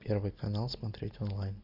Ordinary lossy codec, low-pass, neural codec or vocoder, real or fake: AAC, 48 kbps; 5.4 kHz; none; real